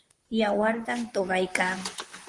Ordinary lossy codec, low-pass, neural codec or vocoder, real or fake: Opus, 24 kbps; 10.8 kHz; vocoder, 44.1 kHz, 128 mel bands, Pupu-Vocoder; fake